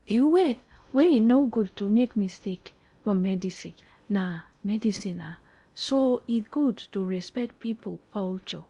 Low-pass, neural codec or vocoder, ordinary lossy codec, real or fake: 10.8 kHz; codec, 16 kHz in and 24 kHz out, 0.6 kbps, FocalCodec, streaming, 4096 codes; Opus, 64 kbps; fake